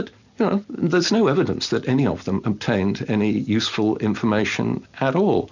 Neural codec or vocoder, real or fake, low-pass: none; real; 7.2 kHz